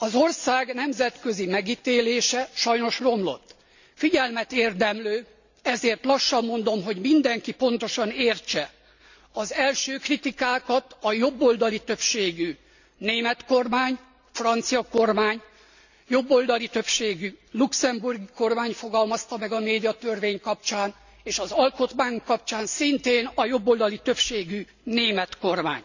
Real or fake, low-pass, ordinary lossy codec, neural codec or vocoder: real; 7.2 kHz; none; none